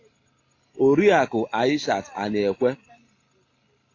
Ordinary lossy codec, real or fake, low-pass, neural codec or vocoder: AAC, 32 kbps; real; 7.2 kHz; none